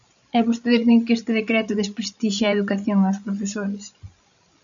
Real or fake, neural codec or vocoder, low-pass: fake; codec, 16 kHz, 16 kbps, FreqCodec, larger model; 7.2 kHz